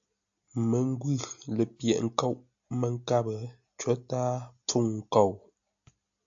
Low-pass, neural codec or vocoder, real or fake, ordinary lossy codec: 7.2 kHz; none; real; AAC, 64 kbps